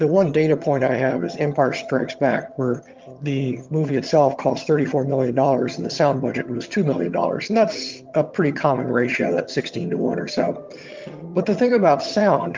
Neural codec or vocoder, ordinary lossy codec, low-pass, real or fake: vocoder, 22.05 kHz, 80 mel bands, HiFi-GAN; Opus, 32 kbps; 7.2 kHz; fake